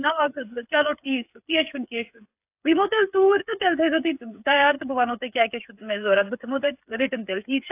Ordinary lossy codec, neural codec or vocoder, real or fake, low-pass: AAC, 32 kbps; codec, 16 kHz, 8 kbps, FreqCodec, smaller model; fake; 3.6 kHz